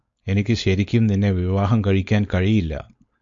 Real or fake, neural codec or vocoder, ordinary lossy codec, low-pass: fake; codec, 16 kHz, 4.8 kbps, FACodec; MP3, 48 kbps; 7.2 kHz